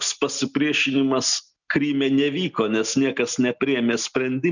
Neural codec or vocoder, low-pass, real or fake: none; 7.2 kHz; real